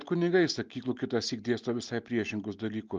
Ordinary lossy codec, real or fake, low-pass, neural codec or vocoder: Opus, 32 kbps; real; 7.2 kHz; none